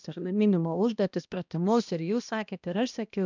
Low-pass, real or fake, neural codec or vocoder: 7.2 kHz; fake; codec, 16 kHz, 1 kbps, X-Codec, HuBERT features, trained on balanced general audio